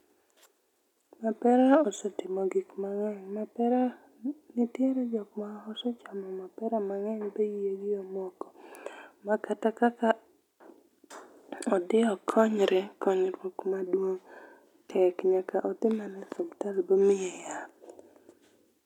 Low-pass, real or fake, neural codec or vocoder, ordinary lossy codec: 19.8 kHz; real; none; none